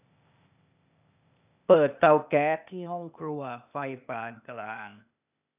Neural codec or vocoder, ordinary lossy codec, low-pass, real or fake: codec, 16 kHz, 0.8 kbps, ZipCodec; none; 3.6 kHz; fake